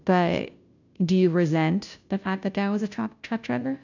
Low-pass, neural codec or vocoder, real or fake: 7.2 kHz; codec, 16 kHz, 0.5 kbps, FunCodec, trained on Chinese and English, 25 frames a second; fake